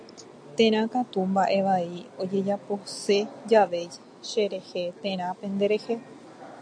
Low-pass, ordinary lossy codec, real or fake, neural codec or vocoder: 9.9 kHz; AAC, 64 kbps; real; none